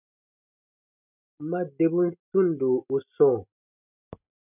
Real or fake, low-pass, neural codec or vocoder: real; 3.6 kHz; none